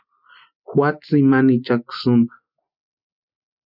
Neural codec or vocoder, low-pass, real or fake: none; 5.4 kHz; real